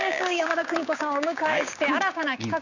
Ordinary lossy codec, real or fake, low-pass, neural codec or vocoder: none; fake; 7.2 kHz; codec, 16 kHz, 6 kbps, DAC